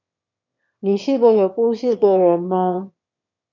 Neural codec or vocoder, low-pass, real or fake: autoencoder, 22.05 kHz, a latent of 192 numbers a frame, VITS, trained on one speaker; 7.2 kHz; fake